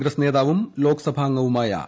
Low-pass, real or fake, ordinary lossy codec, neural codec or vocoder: none; real; none; none